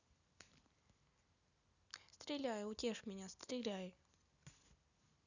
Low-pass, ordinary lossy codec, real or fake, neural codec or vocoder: 7.2 kHz; none; real; none